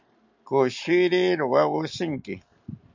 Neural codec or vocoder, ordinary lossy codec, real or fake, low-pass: none; MP3, 64 kbps; real; 7.2 kHz